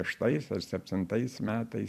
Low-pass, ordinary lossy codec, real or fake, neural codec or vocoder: 14.4 kHz; MP3, 96 kbps; fake; vocoder, 44.1 kHz, 128 mel bands every 256 samples, BigVGAN v2